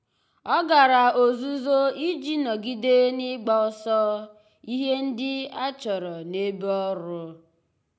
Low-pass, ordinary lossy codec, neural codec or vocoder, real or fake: none; none; none; real